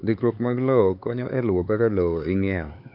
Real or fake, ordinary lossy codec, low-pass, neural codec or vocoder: fake; none; 5.4 kHz; codec, 16 kHz, 4 kbps, X-Codec, HuBERT features, trained on LibriSpeech